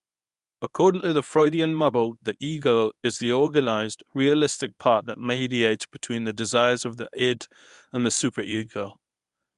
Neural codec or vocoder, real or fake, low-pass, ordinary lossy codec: codec, 24 kHz, 0.9 kbps, WavTokenizer, medium speech release version 1; fake; 10.8 kHz; none